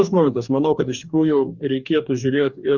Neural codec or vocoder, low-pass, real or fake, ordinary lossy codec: codec, 44.1 kHz, 2.6 kbps, DAC; 7.2 kHz; fake; Opus, 64 kbps